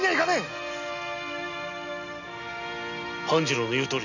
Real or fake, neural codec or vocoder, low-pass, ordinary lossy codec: real; none; 7.2 kHz; none